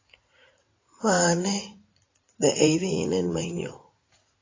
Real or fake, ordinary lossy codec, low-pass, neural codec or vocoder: fake; AAC, 32 kbps; 7.2 kHz; vocoder, 44.1 kHz, 80 mel bands, Vocos